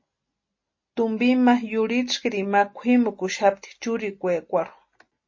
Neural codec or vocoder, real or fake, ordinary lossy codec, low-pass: none; real; MP3, 32 kbps; 7.2 kHz